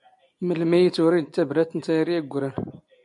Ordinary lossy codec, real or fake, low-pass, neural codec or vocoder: MP3, 96 kbps; real; 10.8 kHz; none